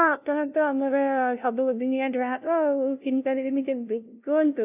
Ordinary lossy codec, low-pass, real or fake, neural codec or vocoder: none; 3.6 kHz; fake; codec, 16 kHz, 0.5 kbps, FunCodec, trained on LibriTTS, 25 frames a second